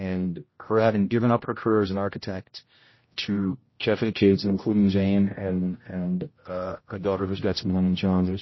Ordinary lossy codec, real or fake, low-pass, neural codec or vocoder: MP3, 24 kbps; fake; 7.2 kHz; codec, 16 kHz, 0.5 kbps, X-Codec, HuBERT features, trained on general audio